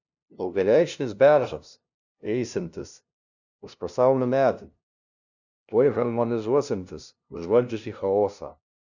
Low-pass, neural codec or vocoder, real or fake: 7.2 kHz; codec, 16 kHz, 0.5 kbps, FunCodec, trained on LibriTTS, 25 frames a second; fake